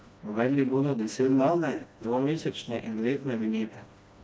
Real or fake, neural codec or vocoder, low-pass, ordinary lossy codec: fake; codec, 16 kHz, 1 kbps, FreqCodec, smaller model; none; none